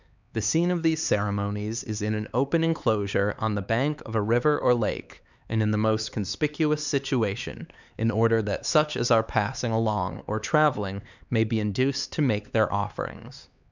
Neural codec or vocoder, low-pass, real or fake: codec, 16 kHz, 4 kbps, X-Codec, HuBERT features, trained on LibriSpeech; 7.2 kHz; fake